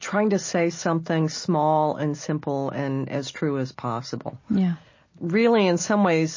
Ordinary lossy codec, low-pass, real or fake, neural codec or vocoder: MP3, 32 kbps; 7.2 kHz; real; none